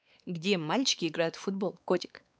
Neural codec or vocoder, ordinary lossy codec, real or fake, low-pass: codec, 16 kHz, 4 kbps, X-Codec, WavLM features, trained on Multilingual LibriSpeech; none; fake; none